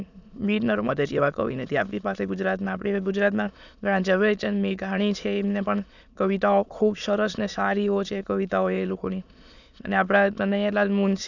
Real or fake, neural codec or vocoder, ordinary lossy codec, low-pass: fake; autoencoder, 22.05 kHz, a latent of 192 numbers a frame, VITS, trained on many speakers; none; 7.2 kHz